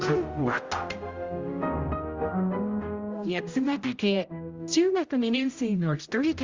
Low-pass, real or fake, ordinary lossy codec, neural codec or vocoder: 7.2 kHz; fake; Opus, 32 kbps; codec, 16 kHz, 0.5 kbps, X-Codec, HuBERT features, trained on general audio